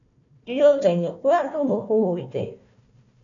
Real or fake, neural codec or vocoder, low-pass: fake; codec, 16 kHz, 1 kbps, FunCodec, trained on Chinese and English, 50 frames a second; 7.2 kHz